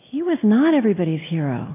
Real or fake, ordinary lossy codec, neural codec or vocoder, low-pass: real; AAC, 24 kbps; none; 3.6 kHz